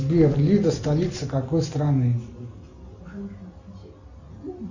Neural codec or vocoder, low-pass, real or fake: none; 7.2 kHz; real